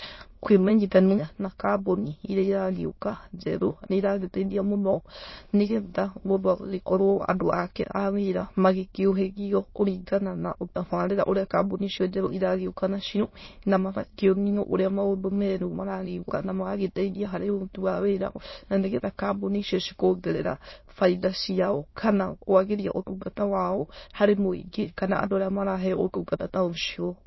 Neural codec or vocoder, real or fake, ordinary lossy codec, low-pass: autoencoder, 22.05 kHz, a latent of 192 numbers a frame, VITS, trained on many speakers; fake; MP3, 24 kbps; 7.2 kHz